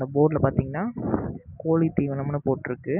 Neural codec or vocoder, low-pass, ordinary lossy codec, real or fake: none; 3.6 kHz; none; real